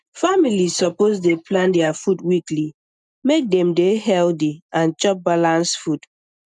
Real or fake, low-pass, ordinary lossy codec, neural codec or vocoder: real; 10.8 kHz; none; none